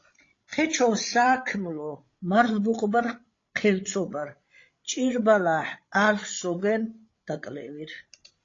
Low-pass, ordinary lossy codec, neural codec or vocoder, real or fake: 7.2 kHz; AAC, 32 kbps; codec, 16 kHz, 16 kbps, FreqCodec, larger model; fake